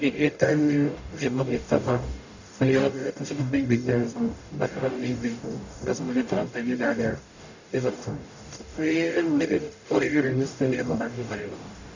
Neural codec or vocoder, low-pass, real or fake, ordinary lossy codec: codec, 44.1 kHz, 0.9 kbps, DAC; 7.2 kHz; fake; none